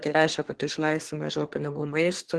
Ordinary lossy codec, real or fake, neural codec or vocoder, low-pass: Opus, 16 kbps; fake; codec, 24 kHz, 1 kbps, SNAC; 10.8 kHz